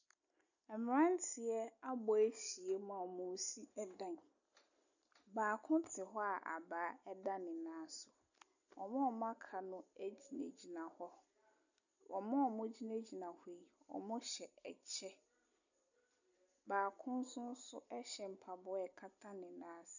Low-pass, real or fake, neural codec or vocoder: 7.2 kHz; real; none